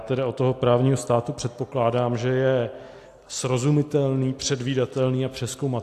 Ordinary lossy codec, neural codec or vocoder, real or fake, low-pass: AAC, 64 kbps; none; real; 14.4 kHz